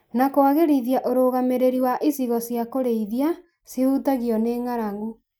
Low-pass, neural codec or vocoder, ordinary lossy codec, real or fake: none; none; none; real